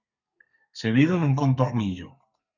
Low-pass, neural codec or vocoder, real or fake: 7.2 kHz; codec, 32 kHz, 1.9 kbps, SNAC; fake